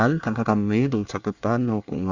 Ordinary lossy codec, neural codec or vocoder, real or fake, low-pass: Opus, 64 kbps; codec, 24 kHz, 1 kbps, SNAC; fake; 7.2 kHz